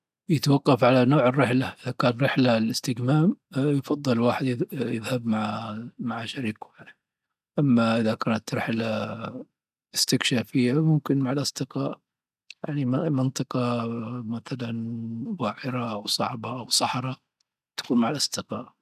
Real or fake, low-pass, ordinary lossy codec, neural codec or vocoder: real; 14.4 kHz; none; none